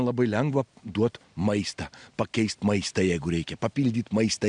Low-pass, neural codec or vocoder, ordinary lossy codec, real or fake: 9.9 kHz; none; MP3, 96 kbps; real